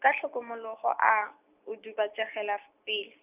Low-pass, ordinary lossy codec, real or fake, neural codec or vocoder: 3.6 kHz; none; real; none